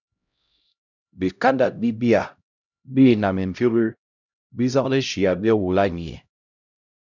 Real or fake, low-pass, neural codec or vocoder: fake; 7.2 kHz; codec, 16 kHz, 0.5 kbps, X-Codec, HuBERT features, trained on LibriSpeech